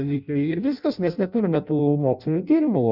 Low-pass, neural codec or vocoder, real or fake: 5.4 kHz; codec, 16 kHz in and 24 kHz out, 0.6 kbps, FireRedTTS-2 codec; fake